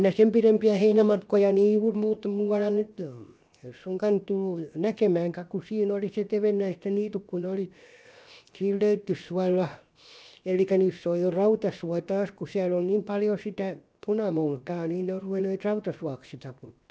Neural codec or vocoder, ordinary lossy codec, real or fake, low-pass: codec, 16 kHz, 0.7 kbps, FocalCodec; none; fake; none